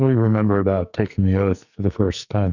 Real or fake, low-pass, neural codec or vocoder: fake; 7.2 kHz; codec, 44.1 kHz, 2.6 kbps, SNAC